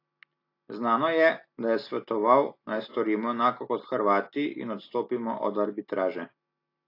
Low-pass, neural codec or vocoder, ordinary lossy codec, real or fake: 5.4 kHz; none; AAC, 32 kbps; real